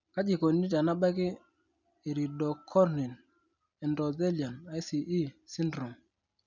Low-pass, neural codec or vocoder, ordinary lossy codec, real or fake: 7.2 kHz; none; none; real